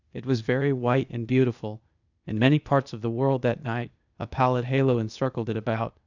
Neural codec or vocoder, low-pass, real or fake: codec, 16 kHz, 0.8 kbps, ZipCodec; 7.2 kHz; fake